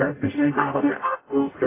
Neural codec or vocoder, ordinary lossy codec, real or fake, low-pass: codec, 44.1 kHz, 0.9 kbps, DAC; AAC, 16 kbps; fake; 3.6 kHz